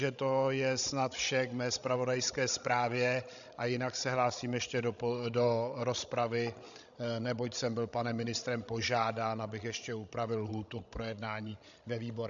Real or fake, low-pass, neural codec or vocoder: fake; 7.2 kHz; codec, 16 kHz, 16 kbps, FreqCodec, larger model